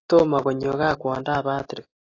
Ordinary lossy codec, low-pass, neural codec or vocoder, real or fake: AAC, 32 kbps; 7.2 kHz; none; real